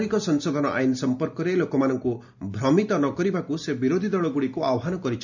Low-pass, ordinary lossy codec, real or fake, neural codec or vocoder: 7.2 kHz; none; real; none